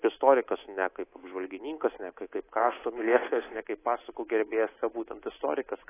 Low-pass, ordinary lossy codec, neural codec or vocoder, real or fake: 3.6 kHz; AAC, 16 kbps; codec, 24 kHz, 3.1 kbps, DualCodec; fake